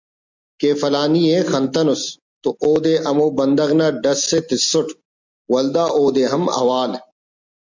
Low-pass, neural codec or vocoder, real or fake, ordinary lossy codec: 7.2 kHz; none; real; MP3, 64 kbps